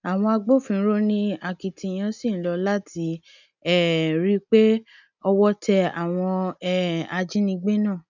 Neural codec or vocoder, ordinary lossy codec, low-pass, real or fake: none; none; 7.2 kHz; real